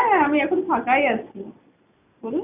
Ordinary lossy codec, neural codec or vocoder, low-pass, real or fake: none; none; 3.6 kHz; real